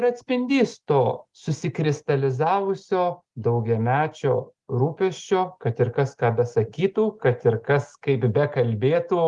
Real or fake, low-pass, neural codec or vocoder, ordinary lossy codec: real; 10.8 kHz; none; Opus, 24 kbps